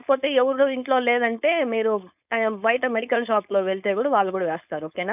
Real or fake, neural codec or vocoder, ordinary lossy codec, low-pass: fake; codec, 16 kHz, 4.8 kbps, FACodec; none; 3.6 kHz